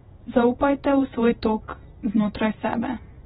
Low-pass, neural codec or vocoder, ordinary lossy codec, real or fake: 19.8 kHz; vocoder, 48 kHz, 128 mel bands, Vocos; AAC, 16 kbps; fake